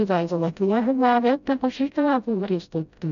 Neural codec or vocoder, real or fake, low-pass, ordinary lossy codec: codec, 16 kHz, 0.5 kbps, FreqCodec, smaller model; fake; 7.2 kHz; none